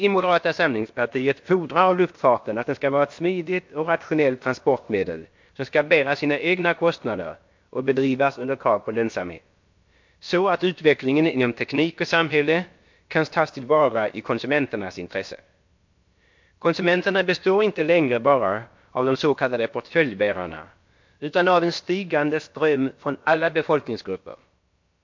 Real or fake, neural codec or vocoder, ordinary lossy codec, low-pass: fake; codec, 16 kHz, about 1 kbps, DyCAST, with the encoder's durations; MP3, 48 kbps; 7.2 kHz